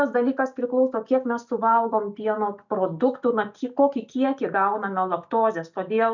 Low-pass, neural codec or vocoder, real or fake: 7.2 kHz; none; real